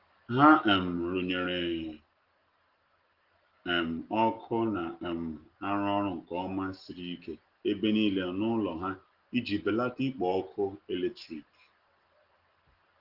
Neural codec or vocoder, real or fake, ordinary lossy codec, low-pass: none; real; Opus, 16 kbps; 5.4 kHz